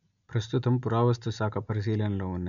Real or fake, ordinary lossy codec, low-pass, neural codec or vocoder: real; none; 7.2 kHz; none